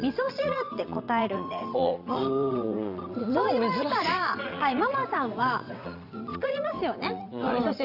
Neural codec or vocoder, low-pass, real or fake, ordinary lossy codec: vocoder, 22.05 kHz, 80 mel bands, WaveNeXt; 5.4 kHz; fake; none